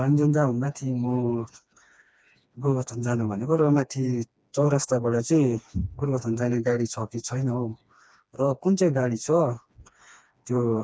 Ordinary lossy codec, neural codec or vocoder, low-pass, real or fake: none; codec, 16 kHz, 2 kbps, FreqCodec, smaller model; none; fake